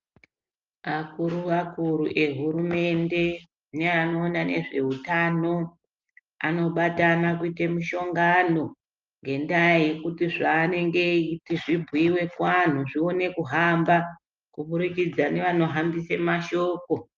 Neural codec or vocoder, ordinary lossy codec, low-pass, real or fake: none; Opus, 24 kbps; 7.2 kHz; real